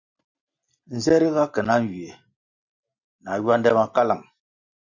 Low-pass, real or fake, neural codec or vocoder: 7.2 kHz; real; none